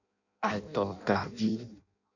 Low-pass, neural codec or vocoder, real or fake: 7.2 kHz; codec, 16 kHz in and 24 kHz out, 0.6 kbps, FireRedTTS-2 codec; fake